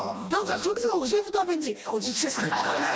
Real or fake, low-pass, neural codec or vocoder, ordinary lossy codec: fake; none; codec, 16 kHz, 1 kbps, FreqCodec, smaller model; none